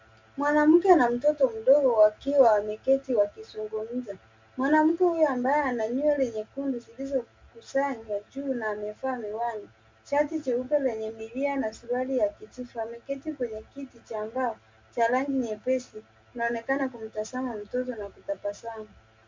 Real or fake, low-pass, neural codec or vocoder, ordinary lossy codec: real; 7.2 kHz; none; MP3, 64 kbps